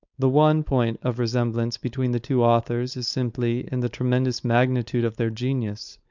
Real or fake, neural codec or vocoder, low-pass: fake; codec, 16 kHz, 4.8 kbps, FACodec; 7.2 kHz